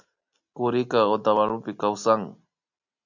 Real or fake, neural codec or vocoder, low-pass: real; none; 7.2 kHz